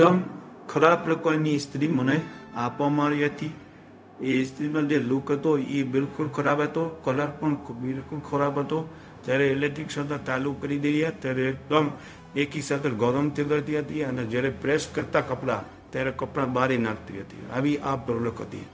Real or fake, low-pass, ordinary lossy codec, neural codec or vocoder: fake; none; none; codec, 16 kHz, 0.4 kbps, LongCat-Audio-Codec